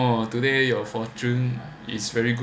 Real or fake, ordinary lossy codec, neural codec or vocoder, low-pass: real; none; none; none